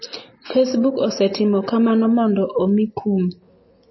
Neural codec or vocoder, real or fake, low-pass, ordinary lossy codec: none; real; 7.2 kHz; MP3, 24 kbps